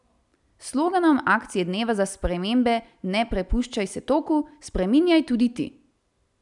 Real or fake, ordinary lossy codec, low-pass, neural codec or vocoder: real; none; 10.8 kHz; none